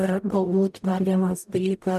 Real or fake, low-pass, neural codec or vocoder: fake; 14.4 kHz; codec, 44.1 kHz, 0.9 kbps, DAC